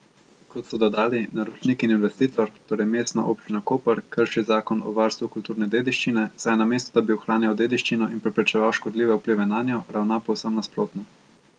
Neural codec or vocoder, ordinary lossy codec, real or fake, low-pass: none; Opus, 32 kbps; real; 9.9 kHz